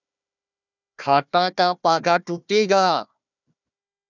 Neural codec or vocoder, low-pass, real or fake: codec, 16 kHz, 1 kbps, FunCodec, trained on Chinese and English, 50 frames a second; 7.2 kHz; fake